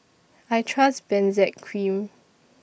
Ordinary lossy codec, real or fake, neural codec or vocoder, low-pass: none; real; none; none